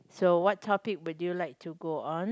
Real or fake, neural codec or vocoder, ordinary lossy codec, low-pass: real; none; none; none